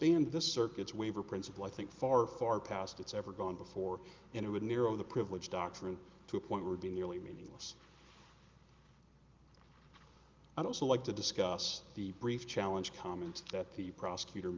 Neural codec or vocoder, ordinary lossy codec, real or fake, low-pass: none; Opus, 24 kbps; real; 7.2 kHz